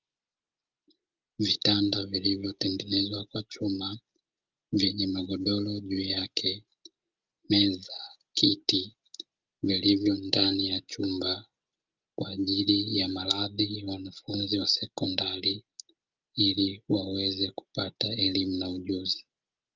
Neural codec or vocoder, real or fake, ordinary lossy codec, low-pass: none; real; Opus, 24 kbps; 7.2 kHz